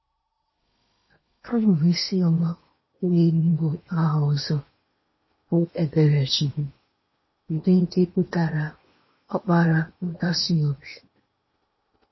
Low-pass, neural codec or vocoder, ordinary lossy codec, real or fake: 7.2 kHz; codec, 16 kHz in and 24 kHz out, 0.8 kbps, FocalCodec, streaming, 65536 codes; MP3, 24 kbps; fake